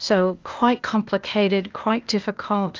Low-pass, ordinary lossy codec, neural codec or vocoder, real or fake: 7.2 kHz; Opus, 32 kbps; codec, 16 kHz, 0.8 kbps, ZipCodec; fake